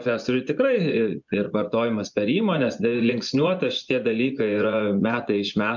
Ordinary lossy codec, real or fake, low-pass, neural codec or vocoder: MP3, 64 kbps; fake; 7.2 kHz; vocoder, 24 kHz, 100 mel bands, Vocos